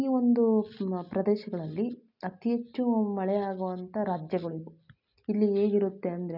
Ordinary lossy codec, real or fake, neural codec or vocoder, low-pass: none; real; none; 5.4 kHz